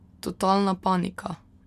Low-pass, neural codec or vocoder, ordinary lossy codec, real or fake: 14.4 kHz; none; MP3, 96 kbps; real